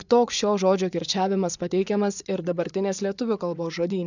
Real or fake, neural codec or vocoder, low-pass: fake; vocoder, 24 kHz, 100 mel bands, Vocos; 7.2 kHz